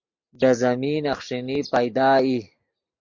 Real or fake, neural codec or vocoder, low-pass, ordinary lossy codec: real; none; 7.2 kHz; MP3, 48 kbps